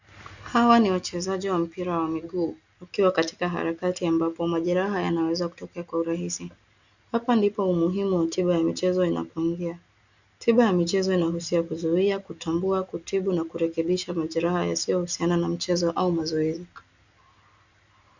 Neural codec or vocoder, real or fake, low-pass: none; real; 7.2 kHz